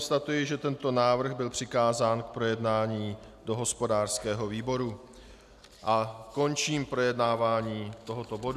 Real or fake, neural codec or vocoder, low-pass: real; none; 14.4 kHz